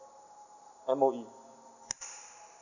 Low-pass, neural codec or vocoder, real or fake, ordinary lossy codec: 7.2 kHz; none; real; none